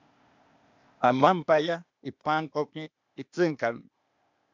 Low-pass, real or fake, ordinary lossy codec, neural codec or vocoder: 7.2 kHz; fake; MP3, 64 kbps; codec, 16 kHz, 0.8 kbps, ZipCodec